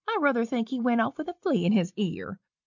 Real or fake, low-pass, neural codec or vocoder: real; 7.2 kHz; none